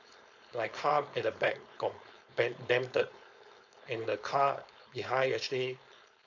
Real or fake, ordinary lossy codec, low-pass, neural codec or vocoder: fake; none; 7.2 kHz; codec, 16 kHz, 4.8 kbps, FACodec